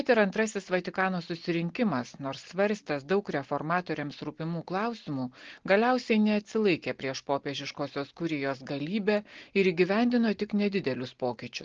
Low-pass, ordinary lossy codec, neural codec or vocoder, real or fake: 7.2 kHz; Opus, 16 kbps; none; real